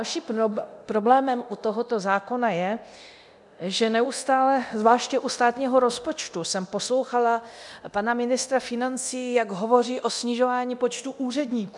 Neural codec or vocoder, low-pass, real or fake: codec, 24 kHz, 0.9 kbps, DualCodec; 10.8 kHz; fake